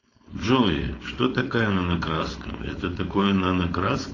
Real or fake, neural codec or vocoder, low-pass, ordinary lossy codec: fake; codec, 16 kHz, 4.8 kbps, FACodec; 7.2 kHz; AAC, 32 kbps